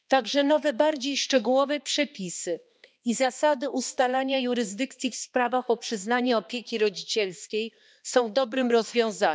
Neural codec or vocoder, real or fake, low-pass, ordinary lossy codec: codec, 16 kHz, 2 kbps, X-Codec, HuBERT features, trained on balanced general audio; fake; none; none